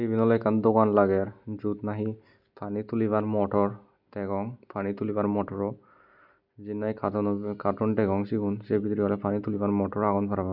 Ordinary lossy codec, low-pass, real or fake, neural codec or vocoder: none; 5.4 kHz; real; none